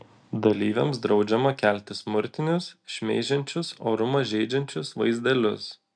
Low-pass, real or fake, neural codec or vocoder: 9.9 kHz; real; none